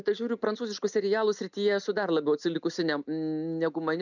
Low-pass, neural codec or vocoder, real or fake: 7.2 kHz; none; real